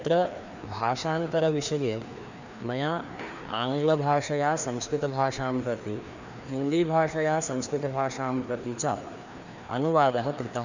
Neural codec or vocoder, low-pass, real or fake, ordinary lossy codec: codec, 16 kHz, 2 kbps, FreqCodec, larger model; 7.2 kHz; fake; none